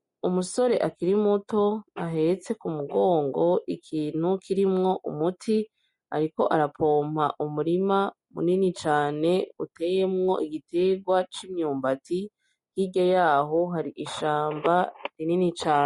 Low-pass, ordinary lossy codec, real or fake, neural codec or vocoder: 19.8 kHz; MP3, 48 kbps; real; none